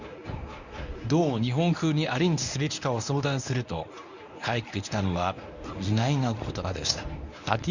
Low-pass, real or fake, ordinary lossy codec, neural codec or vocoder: 7.2 kHz; fake; none; codec, 24 kHz, 0.9 kbps, WavTokenizer, medium speech release version 1